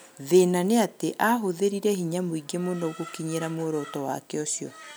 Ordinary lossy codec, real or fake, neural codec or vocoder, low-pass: none; real; none; none